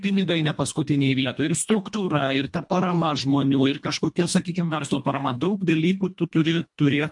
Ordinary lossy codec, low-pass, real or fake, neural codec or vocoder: MP3, 64 kbps; 10.8 kHz; fake; codec, 24 kHz, 1.5 kbps, HILCodec